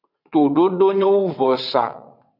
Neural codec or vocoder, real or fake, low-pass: vocoder, 22.05 kHz, 80 mel bands, WaveNeXt; fake; 5.4 kHz